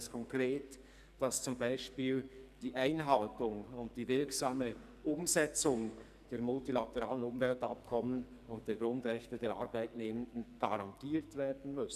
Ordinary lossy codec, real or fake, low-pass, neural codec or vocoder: none; fake; 14.4 kHz; codec, 44.1 kHz, 2.6 kbps, SNAC